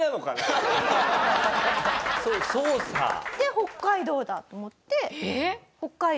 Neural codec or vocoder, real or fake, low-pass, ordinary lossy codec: none; real; none; none